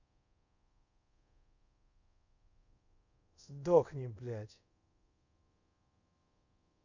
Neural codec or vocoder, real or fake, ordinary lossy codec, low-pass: codec, 24 kHz, 0.5 kbps, DualCodec; fake; none; 7.2 kHz